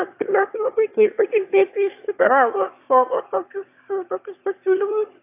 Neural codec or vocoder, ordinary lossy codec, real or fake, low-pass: autoencoder, 22.05 kHz, a latent of 192 numbers a frame, VITS, trained on one speaker; AAC, 32 kbps; fake; 3.6 kHz